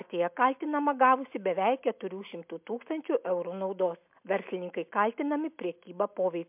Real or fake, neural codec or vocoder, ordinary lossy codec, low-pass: real; none; MP3, 32 kbps; 3.6 kHz